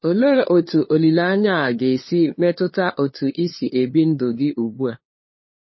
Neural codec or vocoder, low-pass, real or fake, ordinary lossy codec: codec, 16 kHz, 2 kbps, FunCodec, trained on LibriTTS, 25 frames a second; 7.2 kHz; fake; MP3, 24 kbps